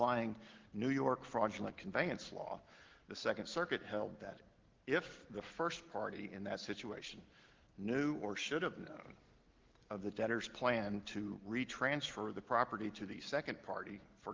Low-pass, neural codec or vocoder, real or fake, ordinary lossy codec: 7.2 kHz; none; real; Opus, 16 kbps